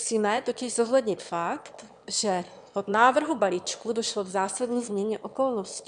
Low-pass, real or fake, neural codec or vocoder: 9.9 kHz; fake; autoencoder, 22.05 kHz, a latent of 192 numbers a frame, VITS, trained on one speaker